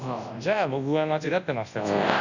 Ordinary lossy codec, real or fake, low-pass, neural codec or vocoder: none; fake; 7.2 kHz; codec, 24 kHz, 0.9 kbps, WavTokenizer, large speech release